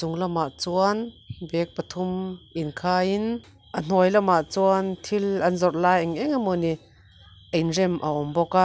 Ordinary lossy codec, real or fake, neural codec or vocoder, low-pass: none; real; none; none